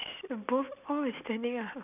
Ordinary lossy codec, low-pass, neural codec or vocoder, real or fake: none; 3.6 kHz; none; real